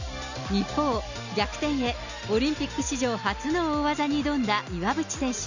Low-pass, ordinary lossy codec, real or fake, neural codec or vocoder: 7.2 kHz; none; real; none